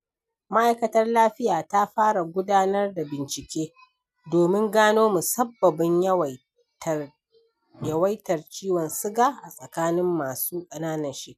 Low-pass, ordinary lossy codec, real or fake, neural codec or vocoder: 14.4 kHz; none; real; none